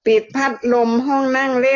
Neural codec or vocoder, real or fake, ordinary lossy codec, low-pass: none; real; AAC, 32 kbps; 7.2 kHz